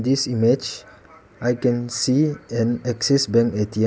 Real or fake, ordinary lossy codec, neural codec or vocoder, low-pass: real; none; none; none